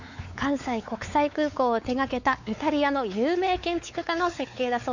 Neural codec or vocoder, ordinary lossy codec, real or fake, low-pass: codec, 16 kHz, 4 kbps, X-Codec, WavLM features, trained on Multilingual LibriSpeech; none; fake; 7.2 kHz